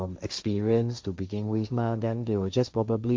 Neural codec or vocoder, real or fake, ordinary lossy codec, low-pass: codec, 16 kHz, 1.1 kbps, Voila-Tokenizer; fake; none; none